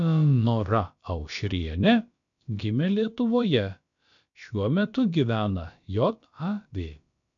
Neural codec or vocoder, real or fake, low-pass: codec, 16 kHz, about 1 kbps, DyCAST, with the encoder's durations; fake; 7.2 kHz